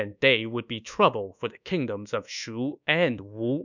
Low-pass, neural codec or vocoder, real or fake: 7.2 kHz; codec, 24 kHz, 1.2 kbps, DualCodec; fake